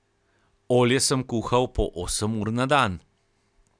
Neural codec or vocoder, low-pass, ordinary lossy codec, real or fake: none; 9.9 kHz; none; real